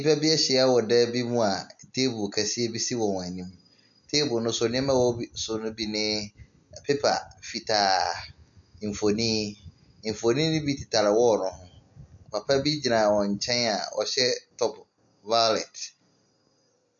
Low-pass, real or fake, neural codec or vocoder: 7.2 kHz; real; none